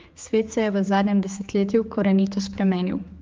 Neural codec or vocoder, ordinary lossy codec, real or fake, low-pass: codec, 16 kHz, 4 kbps, X-Codec, HuBERT features, trained on general audio; Opus, 24 kbps; fake; 7.2 kHz